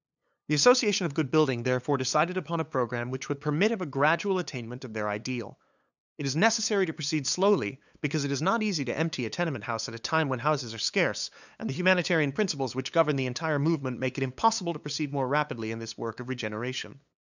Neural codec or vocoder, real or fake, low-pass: codec, 16 kHz, 8 kbps, FunCodec, trained on LibriTTS, 25 frames a second; fake; 7.2 kHz